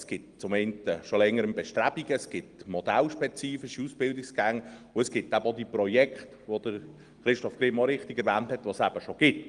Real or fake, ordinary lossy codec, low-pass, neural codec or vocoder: real; Opus, 24 kbps; 9.9 kHz; none